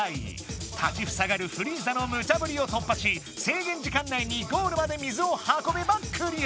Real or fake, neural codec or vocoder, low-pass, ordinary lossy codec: real; none; none; none